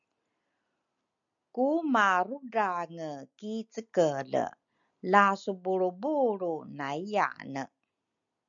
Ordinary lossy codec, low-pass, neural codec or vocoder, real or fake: MP3, 96 kbps; 7.2 kHz; none; real